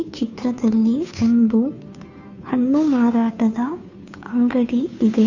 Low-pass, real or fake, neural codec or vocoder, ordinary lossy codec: 7.2 kHz; fake; codec, 16 kHz, 2 kbps, FunCodec, trained on Chinese and English, 25 frames a second; none